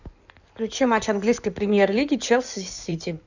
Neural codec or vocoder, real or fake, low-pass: codec, 16 kHz in and 24 kHz out, 2.2 kbps, FireRedTTS-2 codec; fake; 7.2 kHz